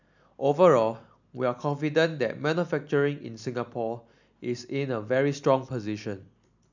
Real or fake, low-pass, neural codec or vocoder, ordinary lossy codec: real; 7.2 kHz; none; none